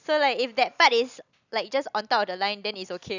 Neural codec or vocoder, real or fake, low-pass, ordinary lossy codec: none; real; 7.2 kHz; none